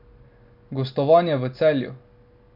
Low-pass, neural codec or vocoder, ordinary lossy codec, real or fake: 5.4 kHz; none; none; real